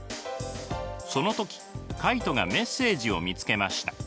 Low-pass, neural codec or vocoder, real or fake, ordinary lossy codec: none; none; real; none